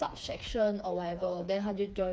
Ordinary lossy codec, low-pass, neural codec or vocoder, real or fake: none; none; codec, 16 kHz, 4 kbps, FreqCodec, smaller model; fake